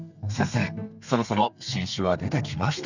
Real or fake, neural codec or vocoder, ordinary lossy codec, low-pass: fake; codec, 32 kHz, 1.9 kbps, SNAC; none; 7.2 kHz